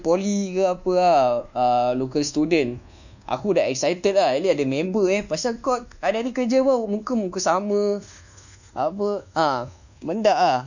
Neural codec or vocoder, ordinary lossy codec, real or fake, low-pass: codec, 24 kHz, 1.2 kbps, DualCodec; none; fake; 7.2 kHz